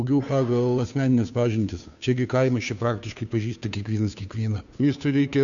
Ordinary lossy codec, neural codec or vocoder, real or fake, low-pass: MP3, 96 kbps; codec, 16 kHz, 2 kbps, FunCodec, trained on Chinese and English, 25 frames a second; fake; 7.2 kHz